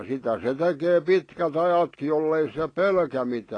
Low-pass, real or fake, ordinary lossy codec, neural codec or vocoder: 9.9 kHz; real; AAC, 32 kbps; none